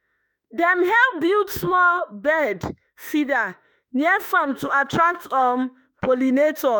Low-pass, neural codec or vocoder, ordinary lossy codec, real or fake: none; autoencoder, 48 kHz, 32 numbers a frame, DAC-VAE, trained on Japanese speech; none; fake